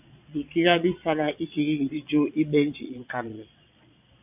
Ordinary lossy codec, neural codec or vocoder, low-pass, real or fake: AAC, 32 kbps; vocoder, 22.05 kHz, 80 mel bands, Vocos; 3.6 kHz; fake